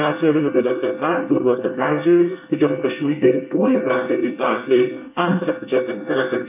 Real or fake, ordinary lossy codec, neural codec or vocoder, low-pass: fake; none; codec, 24 kHz, 1 kbps, SNAC; 3.6 kHz